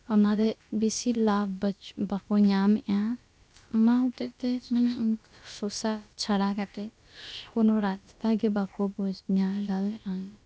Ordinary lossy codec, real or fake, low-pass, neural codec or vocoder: none; fake; none; codec, 16 kHz, about 1 kbps, DyCAST, with the encoder's durations